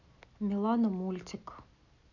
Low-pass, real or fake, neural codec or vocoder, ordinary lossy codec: 7.2 kHz; real; none; none